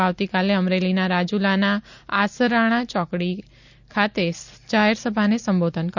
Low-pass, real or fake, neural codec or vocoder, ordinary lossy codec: 7.2 kHz; real; none; none